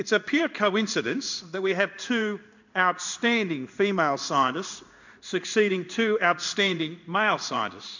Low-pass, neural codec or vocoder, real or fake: 7.2 kHz; codec, 16 kHz in and 24 kHz out, 1 kbps, XY-Tokenizer; fake